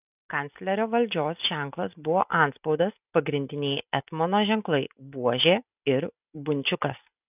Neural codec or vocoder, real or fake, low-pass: none; real; 3.6 kHz